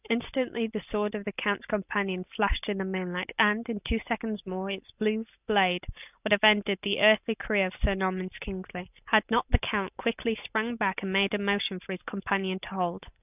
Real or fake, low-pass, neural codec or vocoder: real; 3.6 kHz; none